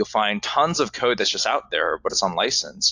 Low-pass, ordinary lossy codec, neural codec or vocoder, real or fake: 7.2 kHz; AAC, 48 kbps; none; real